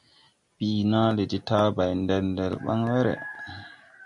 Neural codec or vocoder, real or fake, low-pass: none; real; 10.8 kHz